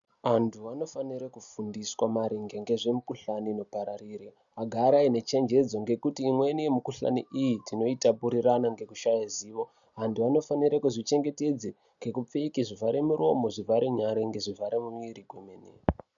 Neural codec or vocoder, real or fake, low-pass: none; real; 7.2 kHz